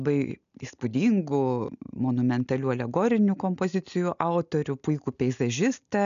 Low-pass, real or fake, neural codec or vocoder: 7.2 kHz; real; none